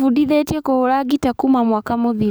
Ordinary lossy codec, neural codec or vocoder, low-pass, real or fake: none; codec, 44.1 kHz, 7.8 kbps, DAC; none; fake